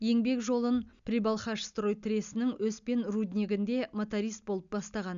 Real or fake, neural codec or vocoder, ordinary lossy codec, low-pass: real; none; MP3, 96 kbps; 7.2 kHz